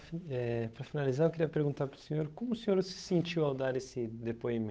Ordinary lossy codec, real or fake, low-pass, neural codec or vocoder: none; fake; none; codec, 16 kHz, 8 kbps, FunCodec, trained on Chinese and English, 25 frames a second